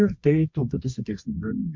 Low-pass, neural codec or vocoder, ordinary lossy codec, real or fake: 7.2 kHz; codec, 24 kHz, 0.9 kbps, WavTokenizer, medium music audio release; MP3, 48 kbps; fake